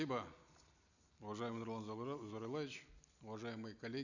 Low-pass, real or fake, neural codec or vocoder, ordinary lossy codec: 7.2 kHz; real; none; none